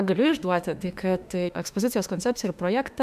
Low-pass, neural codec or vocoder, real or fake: 14.4 kHz; autoencoder, 48 kHz, 32 numbers a frame, DAC-VAE, trained on Japanese speech; fake